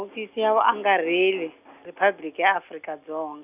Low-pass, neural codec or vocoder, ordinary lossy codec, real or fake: 3.6 kHz; none; none; real